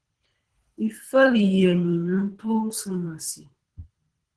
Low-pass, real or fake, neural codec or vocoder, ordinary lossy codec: 10.8 kHz; fake; codec, 24 kHz, 3 kbps, HILCodec; Opus, 16 kbps